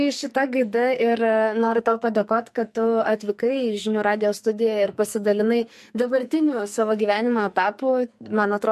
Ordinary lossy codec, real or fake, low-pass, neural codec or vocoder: MP3, 64 kbps; fake; 14.4 kHz; codec, 44.1 kHz, 2.6 kbps, SNAC